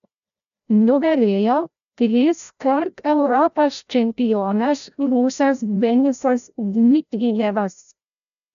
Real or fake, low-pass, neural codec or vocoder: fake; 7.2 kHz; codec, 16 kHz, 0.5 kbps, FreqCodec, larger model